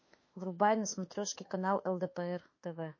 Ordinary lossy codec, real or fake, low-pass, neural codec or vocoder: MP3, 32 kbps; fake; 7.2 kHz; autoencoder, 48 kHz, 32 numbers a frame, DAC-VAE, trained on Japanese speech